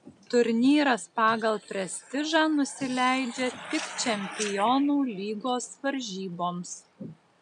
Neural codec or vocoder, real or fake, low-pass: none; real; 9.9 kHz